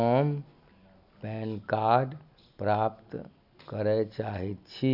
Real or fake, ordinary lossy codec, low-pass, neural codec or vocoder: real; none; 5.4 kHz; none